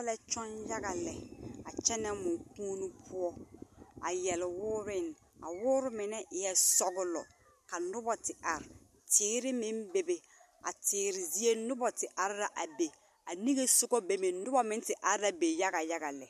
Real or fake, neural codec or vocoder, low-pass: real; none; 14.4 kHz